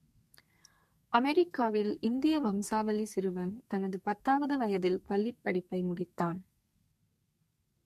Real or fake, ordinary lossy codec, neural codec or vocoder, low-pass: fake; MP3, 64 kbps; codec, 32 kHz, 1.9 kbps, SNAC; 14.4 kHz